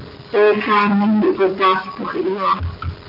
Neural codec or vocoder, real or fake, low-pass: none; real; 5.4 kHz